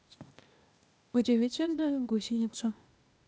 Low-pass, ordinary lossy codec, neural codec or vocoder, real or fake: none; none; codec, 16 kHz, 0.8 kbps, ZipCodec; fake